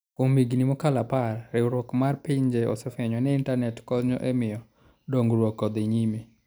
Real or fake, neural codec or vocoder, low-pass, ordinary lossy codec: real; none; none; none